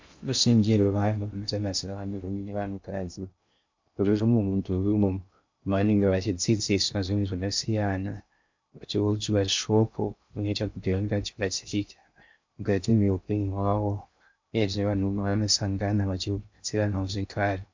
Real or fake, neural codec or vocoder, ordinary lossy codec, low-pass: fake; codec, 16 kHz in and 24 kHz out, 0.6 kbps, FocalCodec, streaming, 2048 codes; MP3, 64 kbps; 7.2 kHz